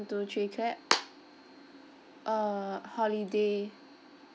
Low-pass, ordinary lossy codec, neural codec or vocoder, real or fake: none; none; none; real